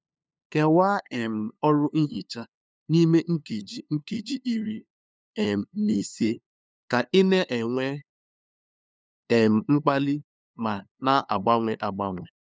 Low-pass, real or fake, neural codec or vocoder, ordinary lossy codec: none; fake; codec, 16 kHz, 2 kbps, FunCodec, trained on LibriTTS, 25 frames a second; none